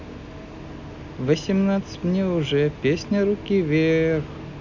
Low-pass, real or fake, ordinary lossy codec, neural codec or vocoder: 7.2 kHz; real; none; none